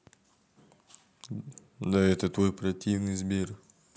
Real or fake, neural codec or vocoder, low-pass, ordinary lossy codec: real; none; none; none